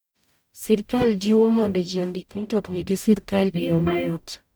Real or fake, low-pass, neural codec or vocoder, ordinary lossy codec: fake; none; codec, 44.1 kHz, 0.9 kbps, DAC; none